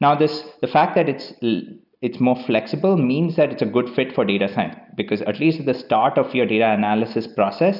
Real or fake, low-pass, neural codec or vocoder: real; 5.4 kHz; none